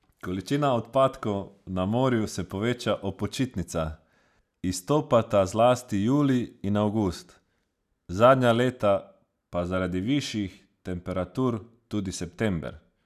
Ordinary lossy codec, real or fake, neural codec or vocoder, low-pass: none; real; none; 14.4 kHz